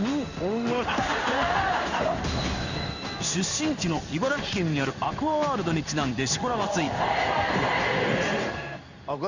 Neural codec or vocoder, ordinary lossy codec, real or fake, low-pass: codec, 16 kHz in and 24 kHz out, 1 kbps, XY-Tokenizer; Opus, 64 kbps; fake; 7.2 kHz